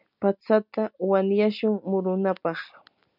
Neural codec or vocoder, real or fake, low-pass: none; real; 5.4 kHz